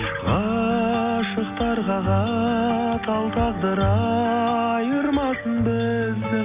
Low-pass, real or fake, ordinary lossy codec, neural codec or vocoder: 3.6 kHz; real; Opus, 64 kbps; none